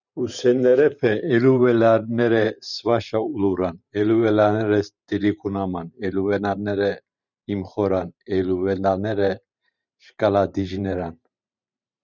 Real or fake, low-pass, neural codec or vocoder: fake; 7.2 kHz; vocoder, 44.1 kHz, 128 mel bands every 512 samples, BigVGAN v2